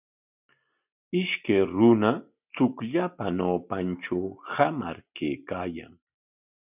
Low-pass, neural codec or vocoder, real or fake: 3.6 kHz; none; real